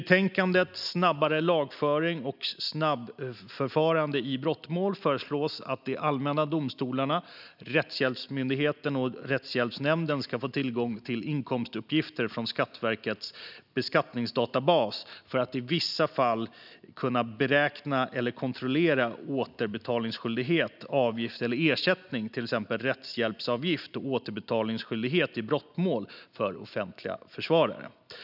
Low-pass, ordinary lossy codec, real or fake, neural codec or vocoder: 5.4 kHz; none; real; none